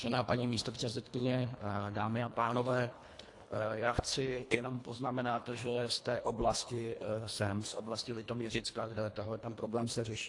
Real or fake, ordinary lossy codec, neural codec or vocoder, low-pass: fake; AAC, 48 kbps; codec, 24 kHz, 1.5 kbps, HILCodec; 10.8 kHz